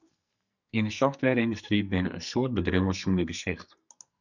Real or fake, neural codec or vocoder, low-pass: fake; codec, 44.1 kHz, 2.6 kbps, SNAC; 7.2 kHz